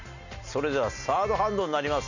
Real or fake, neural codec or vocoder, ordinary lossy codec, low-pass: real; none; none; 7.2 kHz